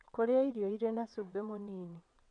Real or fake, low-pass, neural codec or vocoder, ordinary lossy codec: fake; 9.9 kHz; vocoder, 22.05 kHz, 80 mel bands, WaveNeXt; none